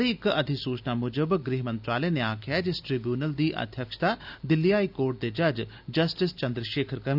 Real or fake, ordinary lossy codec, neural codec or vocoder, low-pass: real; none; none; 5.4 kHz